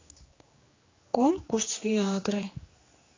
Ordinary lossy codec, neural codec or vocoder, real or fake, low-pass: AAC, 32 kbps; codec, 16 kHz, 2 kbps, X-Codec, HuBERT features, trained on general audio; fake; 7.2 kHz